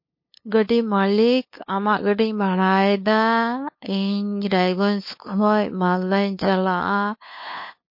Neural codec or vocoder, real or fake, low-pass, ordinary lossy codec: codec, 16 kHz, 2 kbps, FunCodec, trained on LibriTTS, 25 frames a second; fake; 5.4 kHz; MP3, 32 kbps